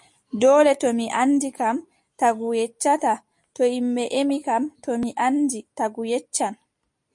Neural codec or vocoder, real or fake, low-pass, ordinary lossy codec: none; real; 10.8 kHz; MP3, 48 kbps